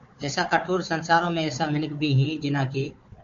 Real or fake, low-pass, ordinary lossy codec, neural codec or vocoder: fake; 7.2 kHz; MP3, 48 kbps; codec, 16 kHz, 4 kbps, FunCodec, trained on Chinese and English, 50 frames a second